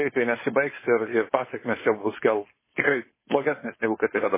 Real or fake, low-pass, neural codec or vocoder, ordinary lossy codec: fake; 3.6 kHz; codec, 16 kHz, 4.8 kbps, FACodec; MP3, 16 kbps